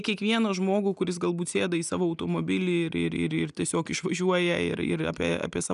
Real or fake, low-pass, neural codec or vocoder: real; 10.8 kHz; none